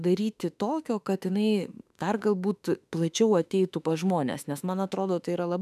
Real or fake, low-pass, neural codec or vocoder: fake; 14.4 kHz; autoencoder, 48 kHz, 32 numbers a frame, DAC-VAE, trained on Japanese speech